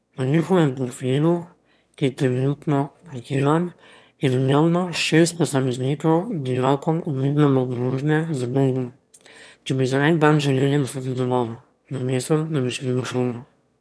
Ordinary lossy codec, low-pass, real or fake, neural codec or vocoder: none; none; fake; autoencoder, 22.05 kHz, a latent of 192 numbers a frame, VITS, trained on one speaker